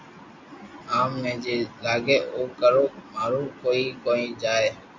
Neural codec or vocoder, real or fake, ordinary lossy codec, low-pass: none; real; MP3, 48 kbps; 7.2 kHz